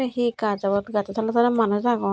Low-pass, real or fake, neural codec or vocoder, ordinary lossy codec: none; real; none; none